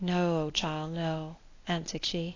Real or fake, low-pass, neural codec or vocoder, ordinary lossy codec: fake; 7.2 kHz; codec, 24 kHz, 0.9 kbps, WavTokenizer, medium speech release version 1; AAC, 32 kbps